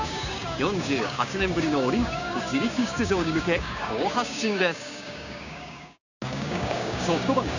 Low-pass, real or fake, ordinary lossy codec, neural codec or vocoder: 7.2 kHz; fake; none; codec, 16 kHz, 6 kbps, DAC